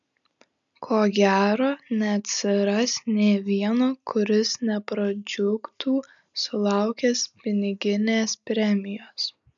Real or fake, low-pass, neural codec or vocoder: real; 7.2 kHz; none